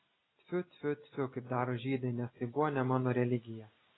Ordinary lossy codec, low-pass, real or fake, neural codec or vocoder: AAC, 16 kbps; 7.2 kHz; real; none